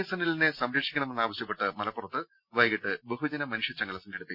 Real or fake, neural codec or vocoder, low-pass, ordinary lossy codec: real; none; 5.4 kHz; Opus, 64 kbps